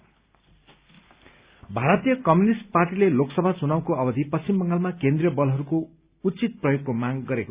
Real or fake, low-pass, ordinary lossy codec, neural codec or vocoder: real; 3.6 kHz; Opus, 64 kbps; none